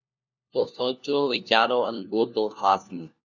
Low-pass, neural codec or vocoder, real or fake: 7.2 kHz; codec, 16 kHz, 1 kbps, FunCodec, trained on LibriTTS, 50 frames a second; fake